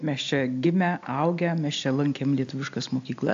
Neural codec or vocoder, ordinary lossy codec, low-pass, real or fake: none; AAC, 64 kbps; 7.2 kHz; real